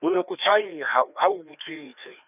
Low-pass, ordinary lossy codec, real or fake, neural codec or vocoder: 3.6 kHz; none; fake; codec, 16 kHz, 2 kbps, FreqCodec, larger model